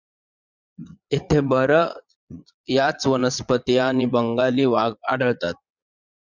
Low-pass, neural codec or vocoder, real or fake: 7.2 kHz; vocoder, 22.05 kHz, 80 mel bands, Vocos; fake